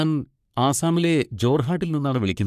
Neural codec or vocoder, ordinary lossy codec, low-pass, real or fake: codec, 44.1 kHz, 3.4 kbps, Pupu-Codec; none; 14.4 kHz; fake